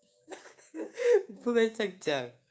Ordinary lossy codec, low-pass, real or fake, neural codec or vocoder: none; none; fake; codec, 16 kHz, 6 kbps, DAC